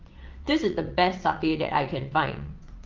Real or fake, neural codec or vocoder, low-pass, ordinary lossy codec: fake; vocoder, 22.05 kHz, 80 mel bands, WaveNeXt; 7.2 kHz; Opus, 32 kbps